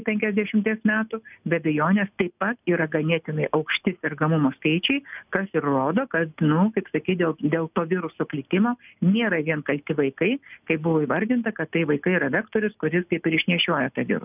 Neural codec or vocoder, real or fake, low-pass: none; real; 3.6 kHz